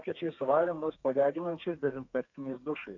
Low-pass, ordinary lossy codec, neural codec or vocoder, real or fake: 7.2 kHz; AAC, 48 kbps; codec, 32 kHz, 1.9 kbps, SNAC; fake